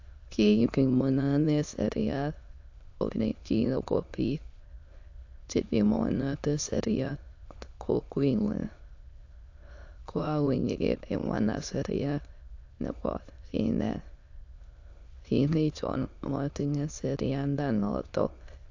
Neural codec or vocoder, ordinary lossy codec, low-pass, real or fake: autoencoder, 22.05 kHz, a latent of 192 numbers a frame, VITS, trained on many speakers; AAC, 48 kbps; 7.2 kHz; fake